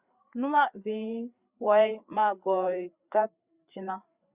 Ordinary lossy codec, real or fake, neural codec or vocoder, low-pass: Opus, 64 kbps; fake; codec, 16 kHz, 4 kbps, FreqCodec, larger model; 3.6 kHz